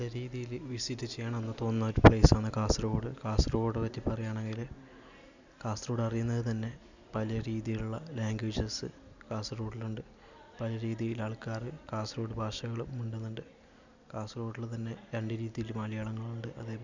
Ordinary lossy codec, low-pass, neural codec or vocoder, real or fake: none; 7.2 kHz; none; real